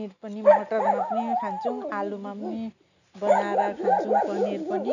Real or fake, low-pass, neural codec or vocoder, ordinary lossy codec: real; 7.2 kHz; none; none